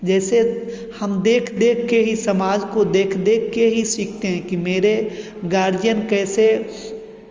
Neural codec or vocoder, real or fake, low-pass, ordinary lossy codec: none; real; 7.2 kHz; Opus, 32 kbps